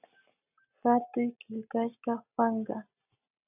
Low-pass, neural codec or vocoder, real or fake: 3.6 kHz; none; real